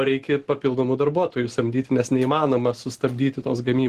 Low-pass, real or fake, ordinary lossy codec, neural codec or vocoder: 14.4 kHz; real; Opus, 16 kbps; none